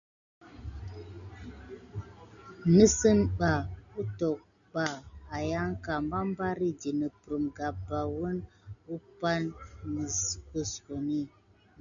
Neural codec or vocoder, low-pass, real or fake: none; 7.2 kHz; real